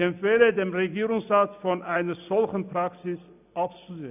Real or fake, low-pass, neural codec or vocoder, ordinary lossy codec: real; 3.6 kHz; none; none